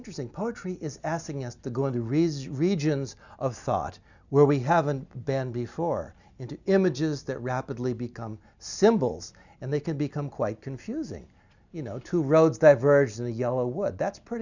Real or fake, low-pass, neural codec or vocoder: real; 7.2 kHz; none